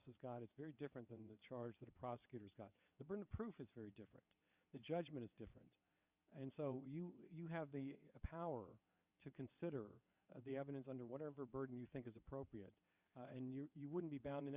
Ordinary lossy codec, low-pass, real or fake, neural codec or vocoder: Opus, 64 kbps; 3.6 kHz; fake; vocoder, 22.05 kHz, 80 mel bands, WaveNeXt